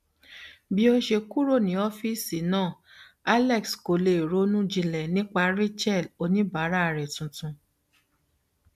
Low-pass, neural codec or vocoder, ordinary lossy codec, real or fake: 14.4 kHz; none; none; real